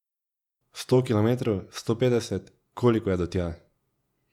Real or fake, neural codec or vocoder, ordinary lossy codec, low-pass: fake; vocoder, 48 kHz, 128 mel bands, Vocos; none; 19.8 kHz